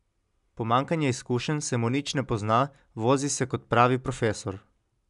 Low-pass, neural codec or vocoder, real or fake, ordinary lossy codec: 10.8 kHz; none; real; none